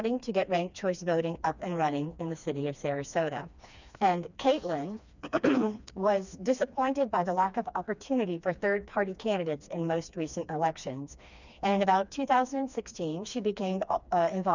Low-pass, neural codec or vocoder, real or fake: 7.2 kHz; codec, 16 kHz, 2 kbps, FreqCodec, smaller model; fake